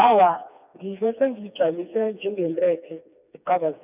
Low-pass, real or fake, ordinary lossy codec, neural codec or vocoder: 3.6 kHz; fake; none; codec, 16 kHz, 2 kbps, FreqCodec, smaller model